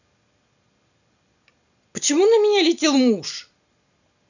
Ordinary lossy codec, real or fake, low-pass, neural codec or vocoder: none; real; 7.2 kHz; none